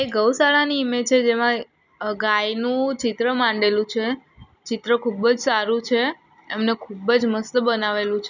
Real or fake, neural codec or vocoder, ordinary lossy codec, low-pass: real; none; none; 7.2 kHz